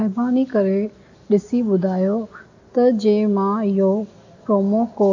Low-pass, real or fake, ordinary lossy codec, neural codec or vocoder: 7.2 kHz; real; AAC, 48 kbps; none